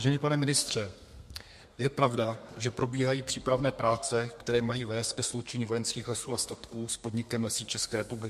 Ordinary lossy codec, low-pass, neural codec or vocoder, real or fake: MP3, 64 kbps; 14.4 kHz; codec, 32 kHz, 1.9 kbps, SNAC; fake